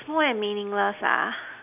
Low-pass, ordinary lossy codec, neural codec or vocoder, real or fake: 3.6 kHz; none; none; real